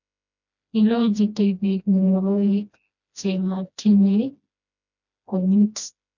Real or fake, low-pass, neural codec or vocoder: fake; 7.2 kHz; codec, 16 kHz, 1 kbps, FreqCodec, smaller model